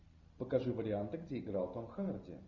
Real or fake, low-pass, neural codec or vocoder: real; 7.2 kHz; none